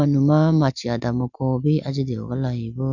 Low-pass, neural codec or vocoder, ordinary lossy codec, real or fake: 7.2 kHz; none; none; real